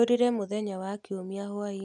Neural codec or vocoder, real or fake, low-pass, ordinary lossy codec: none; real; 10.8 kHz; none